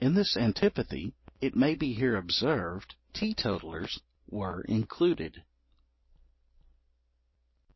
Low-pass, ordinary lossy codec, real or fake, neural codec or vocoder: 7.2 kHz; MP3, 24 kbps; real; none